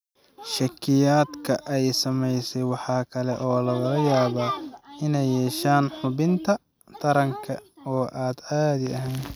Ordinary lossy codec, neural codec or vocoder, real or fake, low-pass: none; none; real; none